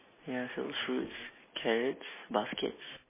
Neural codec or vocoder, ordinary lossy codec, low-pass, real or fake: none; MP3, 16 kbps; 3.6 kHz; real